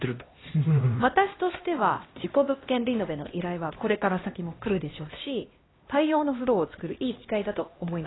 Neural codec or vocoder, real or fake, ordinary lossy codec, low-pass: codec, 16 kHz, 1 kbps, X-Codec, HuBERT features, trained on LibriSpeech; fake; AAC, 16 kbps; 7.2 kHz